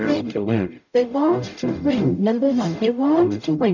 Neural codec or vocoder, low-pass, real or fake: codec, 44.1 kHz, 0.9 kbps, DAC; 7.2 kHz; fake